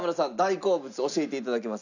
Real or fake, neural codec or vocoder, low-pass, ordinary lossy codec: real; none; 7.2 kHz; none